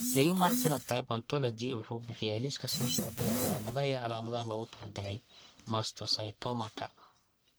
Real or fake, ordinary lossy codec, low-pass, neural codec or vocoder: fake; none; none; codec, 44.1 kHz, 1.7 kbps, Pupu-Codec